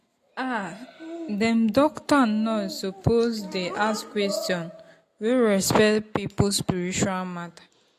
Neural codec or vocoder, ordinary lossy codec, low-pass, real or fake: none; AAC, 48 kbps; 14.4 kHz; real